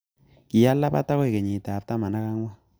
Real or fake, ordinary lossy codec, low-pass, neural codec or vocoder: real; none; none; none